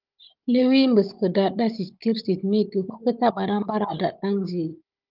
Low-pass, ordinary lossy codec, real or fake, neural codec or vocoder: 5.4 kHz; Opus, 24 kbps; fake; codec, 16 kHz, 16 kbps, FunCodec, trained on Chinese and English, 50 frames a second